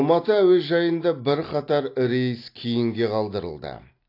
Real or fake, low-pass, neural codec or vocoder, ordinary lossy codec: real; 5.4 kHz; none; AAC, 32 kbps